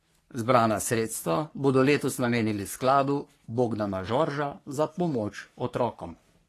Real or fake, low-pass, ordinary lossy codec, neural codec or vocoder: fake; 14.4 kHz; AAC, 48 kbps; codec, 44.1 kHz, 3.4 kbps, Pupu-Codec